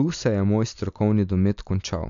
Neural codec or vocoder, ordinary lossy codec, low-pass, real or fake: none; MP3, 64 kbps; 7.2 kHz; real